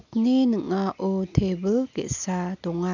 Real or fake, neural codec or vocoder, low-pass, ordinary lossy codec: real; none; 7.2 kHz; none